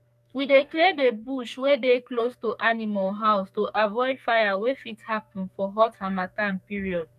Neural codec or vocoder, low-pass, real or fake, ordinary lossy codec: codec, 44.1 kHz, 2.6 kbps, SNAC; 14.4 kHz; fake; none